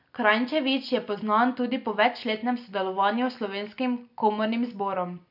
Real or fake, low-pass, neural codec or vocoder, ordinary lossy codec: real; 5.4 kHz; none; none